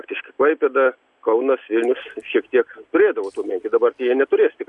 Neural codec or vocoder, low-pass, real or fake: none; 10.8 kHz; real